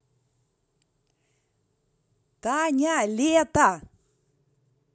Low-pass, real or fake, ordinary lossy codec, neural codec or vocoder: none; real; none; none